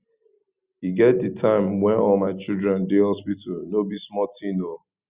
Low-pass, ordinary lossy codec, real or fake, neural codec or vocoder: 3.6 kHz; Opus, 64 kbps; real; none